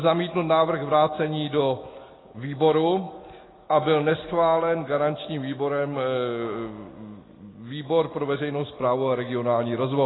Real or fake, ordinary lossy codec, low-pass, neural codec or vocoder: real; AAC, 16 kbps; 7.2 kHz; none